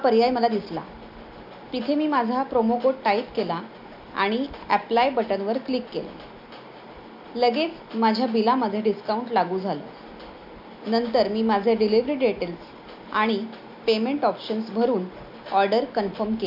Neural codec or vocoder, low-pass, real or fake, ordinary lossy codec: none; 5.4 kHz; real; AAC, 48 kbps